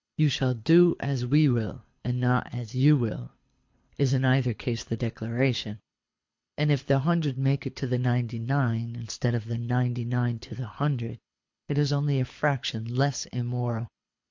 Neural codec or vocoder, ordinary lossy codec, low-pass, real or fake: codec, 24 kHz, 6 kbps, HILCodec; MP3, 48 kbps; 7.2 kHz; fake